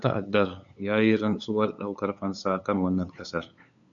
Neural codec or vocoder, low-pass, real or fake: codec, 16 kHz, 8 kbps, FunCodec, trained on LibriTTS, 25 frames a second; 7.2 kHz; fake